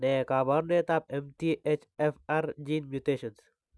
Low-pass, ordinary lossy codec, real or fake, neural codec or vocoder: none; none; real; none